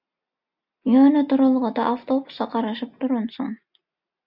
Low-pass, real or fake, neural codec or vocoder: 5.4 kHz; real; none